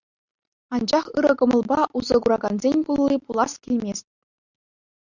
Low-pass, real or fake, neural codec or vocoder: 7.2 kHz; real; none